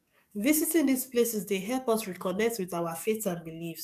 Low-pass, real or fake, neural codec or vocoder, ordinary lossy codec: 14.4 kHz; fake; codec, 44.1 kHz, 7.8 kbps, DAC; none